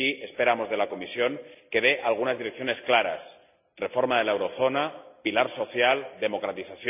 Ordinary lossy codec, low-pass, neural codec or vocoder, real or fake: none; 3.6 kHz; none; real